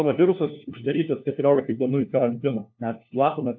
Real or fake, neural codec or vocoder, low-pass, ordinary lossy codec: fake; codec, 16 kHz, 1 kbps, FunCodec, trained on LibriTTS, 50 frames a second; 7.2 kHz; AAC, 48 kbps